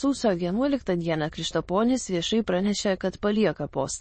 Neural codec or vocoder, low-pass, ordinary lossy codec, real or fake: autoencoder, 22.05 kHz, a latent of 192 numbers a frame, VITS, trained on many speakers; 9.9 kHz; MP3, 32 kbps; fake